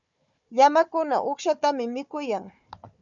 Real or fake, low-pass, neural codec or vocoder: fake; 7.2 kHz; codec, 16 kHz, 4 kbps, FunCodec, trained on Chinese and English, 50 frames a second